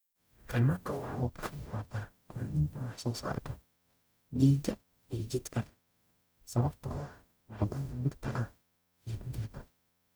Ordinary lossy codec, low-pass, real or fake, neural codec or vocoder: none; none; fake; codec, 44.1 kHz, 0.9 kbps, DAC